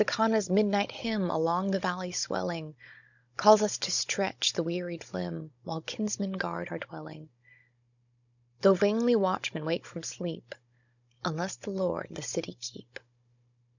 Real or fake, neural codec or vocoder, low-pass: fake; codec, 16 kHz, 16 kbps, FunCodec, trained on Chinese and English, 50 frames a second; 7.2 kHz